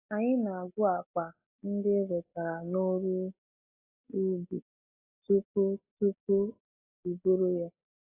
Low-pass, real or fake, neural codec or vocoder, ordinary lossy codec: 3.6 kHz; real; none; AAC, 24 kbps